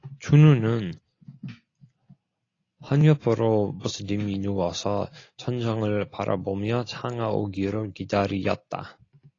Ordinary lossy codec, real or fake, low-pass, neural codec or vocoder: AAC, 32 kbps; real; 7.2 kHz; none